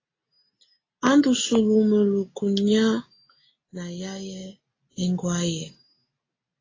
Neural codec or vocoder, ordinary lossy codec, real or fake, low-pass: none; AAC, 32 kbps; real; 7.2 kHz